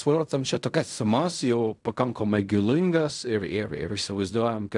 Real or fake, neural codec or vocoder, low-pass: fake; codec, 16 kHz in and 24 kHz out, 0.4 kbps, LongCat-Audio-Codec, fine tuned four codebook decoder; 10.8 kHz